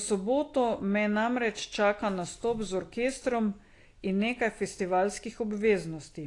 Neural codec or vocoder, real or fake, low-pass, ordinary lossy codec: none; real; 10.8 kHz; AAC, 48 kbps